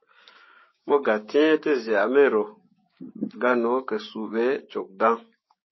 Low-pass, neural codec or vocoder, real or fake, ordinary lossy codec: 7.2 kHz; codec, 16 kHz, 6 kbps, DAC; fake; MP3, 24 kbps